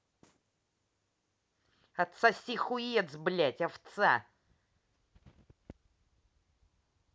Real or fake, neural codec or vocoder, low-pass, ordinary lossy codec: real; none; none; none